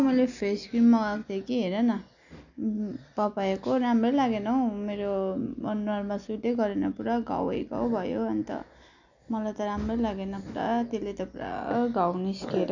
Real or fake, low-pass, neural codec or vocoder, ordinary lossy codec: real; 7.2 kHz; none; none